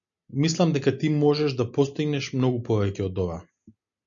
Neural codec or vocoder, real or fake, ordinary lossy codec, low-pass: none; real; MP3, 96 kbps; 7.2 kHz